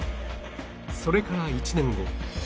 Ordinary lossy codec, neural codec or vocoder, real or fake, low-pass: none; none; real; none